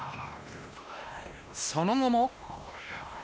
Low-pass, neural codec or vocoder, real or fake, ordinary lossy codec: none; codec, 16 kHz, 1 kbps, X-Codec, WavLM features, trained on Multilingual LibriSpeech; fake; none